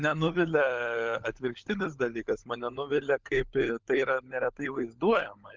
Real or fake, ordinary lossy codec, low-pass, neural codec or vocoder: fake; Opus, 24 kbps; 7.2 kHz; codec, 16 kHz, 16 kbps, FunCodec, trained on LibriTTS, 50 frames a second